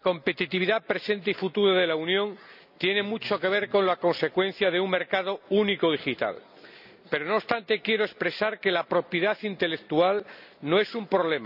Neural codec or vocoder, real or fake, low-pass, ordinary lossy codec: none; real; 5.4 kHz; none